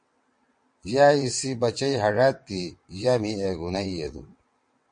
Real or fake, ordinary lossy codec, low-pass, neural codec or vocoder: fake; MP3, 48 kbps; 9.9 kHz; vocoder, 22.05 kHz, 80 mel bands, WaveNeXt